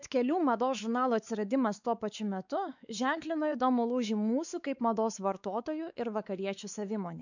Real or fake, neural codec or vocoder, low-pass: fake; codec, 16 kHz, 4 kbps, X-Codec, WavLM features, trained on Multilingual LibriSpeech; 7.2 kHz